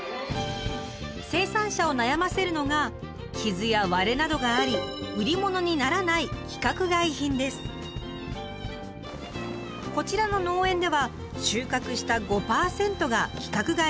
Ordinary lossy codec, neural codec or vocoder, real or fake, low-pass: none; none; real; none